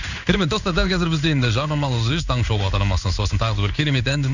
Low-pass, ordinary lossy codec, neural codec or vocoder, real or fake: 7.2 kHz; none; codec, 16 kHz in and 24 kHz out, 1 kbps, XY-Tokenizer; fake